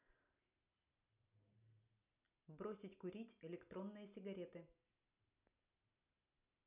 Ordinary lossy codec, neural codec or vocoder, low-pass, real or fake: none; none; 3.6 kHz; real